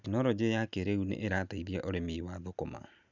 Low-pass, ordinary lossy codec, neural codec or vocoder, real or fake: 7.2 kHz; none; none; real